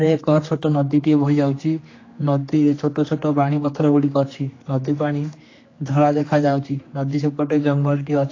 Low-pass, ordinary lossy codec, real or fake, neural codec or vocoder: 7.2 kHz; AAC, 32 kbps; fake; codec, 16 kHz, 2 kbps, X-Codec, HuBERT features, trained on general audio